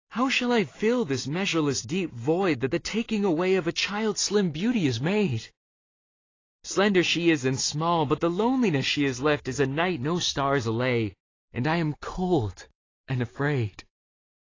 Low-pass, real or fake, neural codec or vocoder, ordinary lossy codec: 7.2 kHz; real; none; AAC, 32 kbps